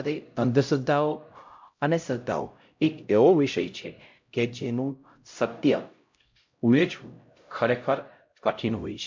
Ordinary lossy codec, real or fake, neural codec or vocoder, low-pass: MP3, 48 kbps; fake; codec, 16 kHz, 0.5 kbps, X-Codec, HuBERT features, trained on LibriSpeech; 7.2 kHz